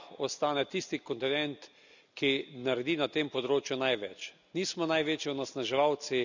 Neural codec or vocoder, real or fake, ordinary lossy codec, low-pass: none; real; none; 7.2 kHz